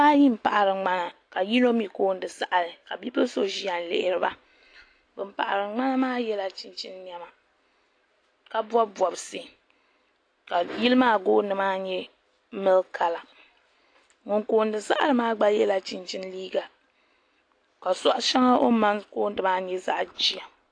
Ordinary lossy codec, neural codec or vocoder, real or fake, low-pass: AAC, 48 kbps; none; real; 9.9 kHz